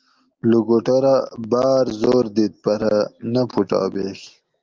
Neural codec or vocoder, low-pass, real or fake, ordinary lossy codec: none; 7.2 kHz; real; Opus, 32 kbps